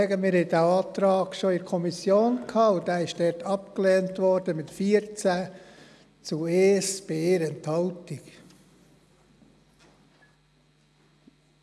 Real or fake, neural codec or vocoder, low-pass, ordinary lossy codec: real; none; none; none